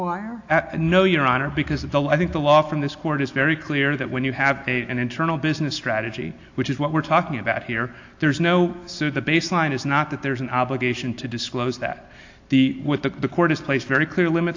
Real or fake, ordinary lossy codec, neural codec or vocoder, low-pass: real; AAC, 48 kbps; none; 7.2 kHz